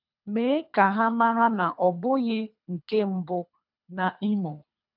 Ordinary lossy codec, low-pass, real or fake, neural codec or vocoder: none; 5.4 kHz; fake; codec, 24 kHz, 3 kbps, HILCodec